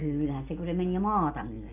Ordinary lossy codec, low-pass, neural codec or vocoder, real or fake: none; 3.6 kHz; none; real